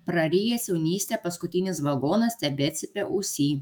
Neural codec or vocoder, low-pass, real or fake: autoencoder, 48 kHz, 128 numbers a frame, DAC-VAE, trained on Japanese speech; 19.8 kHz; fake